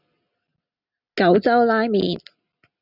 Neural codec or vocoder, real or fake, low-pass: none; real; 5.4 kHz